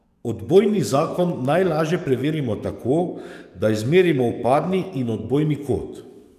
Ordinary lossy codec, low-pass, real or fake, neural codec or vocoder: none; 14.4 kHz; fake; codec, 44.1 kHz, 7.8 kbps, DAC